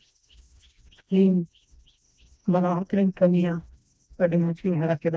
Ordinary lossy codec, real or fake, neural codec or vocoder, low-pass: none; fake; codec, 16 kHz, 1 kbps, FreqCodec, smaller model; none